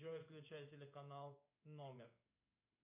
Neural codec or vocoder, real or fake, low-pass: codec, 16 kHz in and 24 kHz out, 1 kbps, XY-Tokenizer; fake; 3.6 kHz